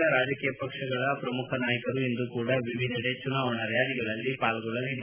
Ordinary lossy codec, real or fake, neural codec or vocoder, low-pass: none; real; none; 3.6 kHz